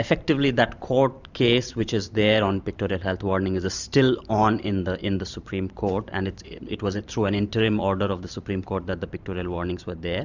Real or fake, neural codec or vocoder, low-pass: fake; vocoder, 44.1 kHz, 128 mel bands every 512 samples, BigVGAN v2; 7.2 kHz